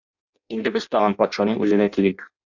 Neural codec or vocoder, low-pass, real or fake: codec, 16 kHz in and 24 kHz out, 0.6 kbps, FireRedTTS-2 codec; 7.2 kHz; fake